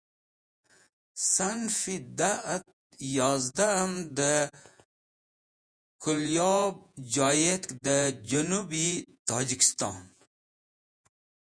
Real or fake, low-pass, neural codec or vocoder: fake; 9.9 kHz; vocoder, 48 kHz, 128 mel bands, Vocos